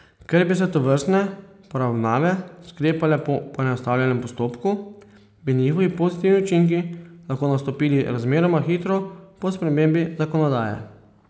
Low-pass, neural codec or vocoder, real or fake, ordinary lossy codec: none; none; real; none